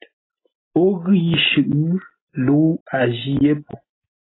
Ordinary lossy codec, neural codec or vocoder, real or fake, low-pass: AAC, 16 kbps; none; real; 7.2 kHz